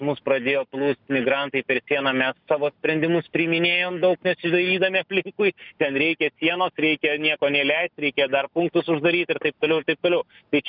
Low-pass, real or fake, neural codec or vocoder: 5.4 kHz; real; none